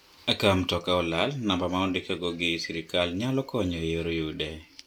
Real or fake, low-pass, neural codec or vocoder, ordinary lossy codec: real; 19.8 kHz; none; none